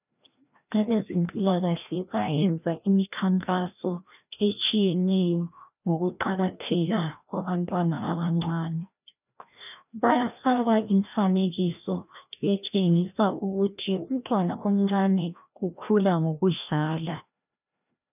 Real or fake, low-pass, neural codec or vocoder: fake; 3.6 kHz; codec, 16 kHz, 1 kbps, FreqCodec, larger model